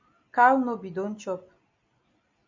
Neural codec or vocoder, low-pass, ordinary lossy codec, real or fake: none; 7.2 kHz; MP3, 64 kbps; real